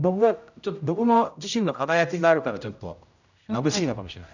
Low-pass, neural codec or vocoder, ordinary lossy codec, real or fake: 7.2 kHz; codec, 16 kHz, 0.5 kbps, X-Codec, HuBERT features, trained on general audio; none; fake